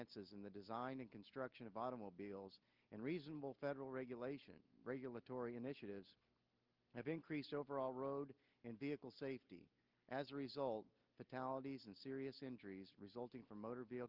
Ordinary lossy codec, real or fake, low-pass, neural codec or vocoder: Opus, 32 kbps; real; 5.4 kHz; none